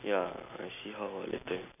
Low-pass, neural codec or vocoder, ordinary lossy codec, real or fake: 3.6 kHz; none; none; real